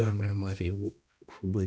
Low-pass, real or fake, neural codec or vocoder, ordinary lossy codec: none; fake; codec, 16 kHz, 1 kbps, X-Codec, HuBERT features, trained on balanced general audio; none